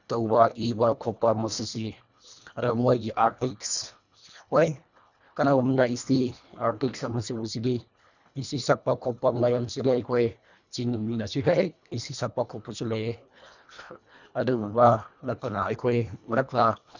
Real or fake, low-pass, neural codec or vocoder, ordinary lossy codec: fake; 7.2 kHz; codec, 24 kHz, 1.5 kbps, HILCodec; none